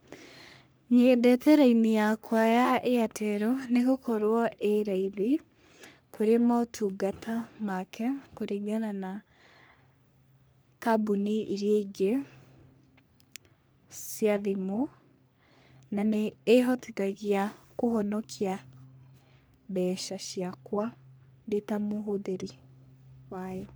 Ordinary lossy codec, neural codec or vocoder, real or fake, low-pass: none; codec, 44.1 kHz, 3.4 kbps, Pupu-Codec; fake; none